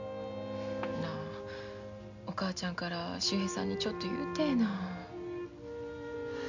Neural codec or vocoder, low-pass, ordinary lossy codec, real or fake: none; 7.2 kHz; MP3, 64 kbps; real